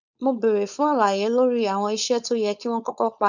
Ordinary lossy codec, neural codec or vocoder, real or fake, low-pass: none; codec, 16 kHz, 4.8 kbps, FACodec; fake; 7.2 kHz